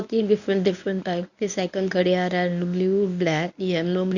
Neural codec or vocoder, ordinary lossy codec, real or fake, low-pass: codec, 24 kHz, 0.9 kbps, WavTokenizer, medium speech release version 1; none; fake; 7.2 kHz